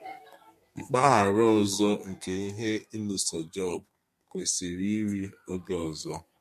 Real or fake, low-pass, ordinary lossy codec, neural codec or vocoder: fake; 14.4 kHz; MP3, 64 kbps; codec, 32 kHz, 1.9 kbps, SNAC